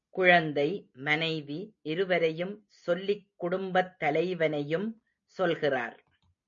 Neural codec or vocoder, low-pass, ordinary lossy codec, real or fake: none; 7.2 kHz; MP3, 48 kbps; real